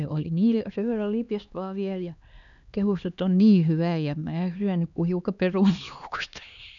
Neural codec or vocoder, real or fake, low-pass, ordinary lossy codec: codec, 16 kHz, 2 kbps, X-Codec, HuBERT features, trained on LibriSpeech; fake; 7.2 kHz; none